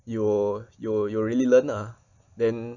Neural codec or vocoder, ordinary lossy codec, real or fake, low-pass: none; none; real; 7.2 kHz